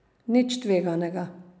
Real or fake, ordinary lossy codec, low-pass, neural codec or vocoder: real; none; none; none